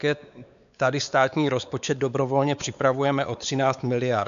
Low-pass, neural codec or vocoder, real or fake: 7.2 kHz; codec, 16 kHz, 4 kbps, X-Codec, WavLM features, trained on Multilingual LibriSpeech; fake